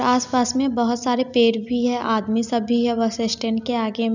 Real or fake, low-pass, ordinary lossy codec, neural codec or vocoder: real; 7.2 kHz; none; none